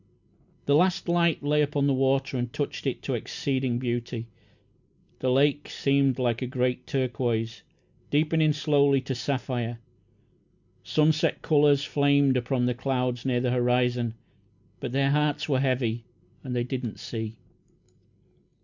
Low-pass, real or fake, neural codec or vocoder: 7.2 kHz; real; none